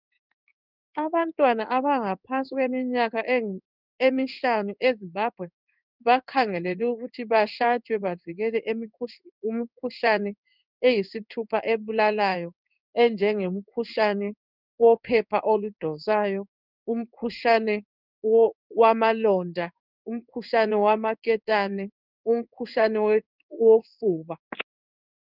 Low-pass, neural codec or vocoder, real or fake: 5.4 kHz; codec, 16 kHz in and 24 kHz out, 1 kbps, XY-Tokenizer; fake